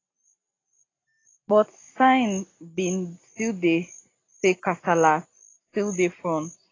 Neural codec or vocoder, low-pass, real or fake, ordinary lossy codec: none; 7.2 kHz; real; AAC, 32 kbps